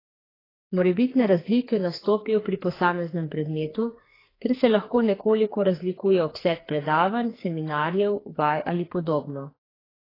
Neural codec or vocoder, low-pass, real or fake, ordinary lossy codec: codec, 44.1 kHz, 2.6 kbps, SNAC; 5.4 kHz; fake; AAC, 24 kbps